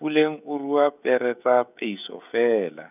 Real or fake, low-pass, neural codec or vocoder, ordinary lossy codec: fake; 3.6 kHz; codec, 16 kHz, 16 kbps, FreqCodec, smaller model; none